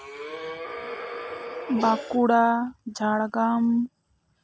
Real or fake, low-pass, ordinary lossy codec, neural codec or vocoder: real; none; none; none